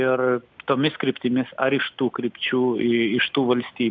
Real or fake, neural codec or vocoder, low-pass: real; none; 7.2 kHz